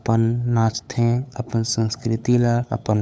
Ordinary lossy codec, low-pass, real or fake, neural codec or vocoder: none; none; fake; codec, 16 kHz, 8 kbps, FunCodec, trained on LibriTTS, 25 frames a second